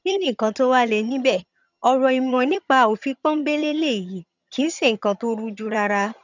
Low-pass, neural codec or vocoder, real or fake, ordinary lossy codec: 7.2 kHz; vocoder, 22.05 kHz, 80 mel bands, HiFi-GAN; fake; none